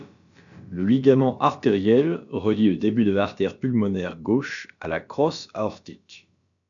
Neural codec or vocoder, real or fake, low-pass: codec, 16 kHz, about 1 kbps, DyCAST, with the encoder's durations; fake; 7.2 kHz